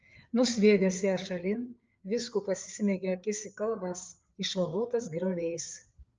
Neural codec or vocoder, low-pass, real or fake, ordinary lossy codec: codec, 16 kHz, 4 kbps, FreqCodec, larger model; 7.2 kHz; fake; Opus, 24 kbps